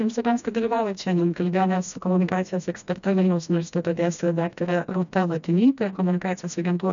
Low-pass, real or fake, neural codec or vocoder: 7.2 kHz; fake; codec, 16 kHz, 1 kbps, FreqCodec, smaller model